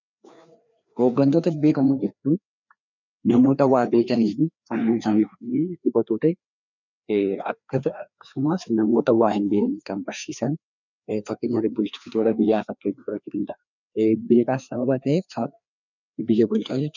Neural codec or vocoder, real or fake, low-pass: codec, 16 kHz, 2 kbps, FreqCodec, larger model; fake; 7.2 kHz